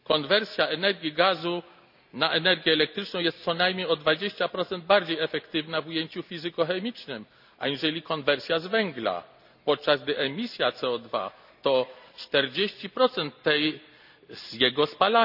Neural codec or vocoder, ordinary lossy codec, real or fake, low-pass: none; none; real; 5.4 kHz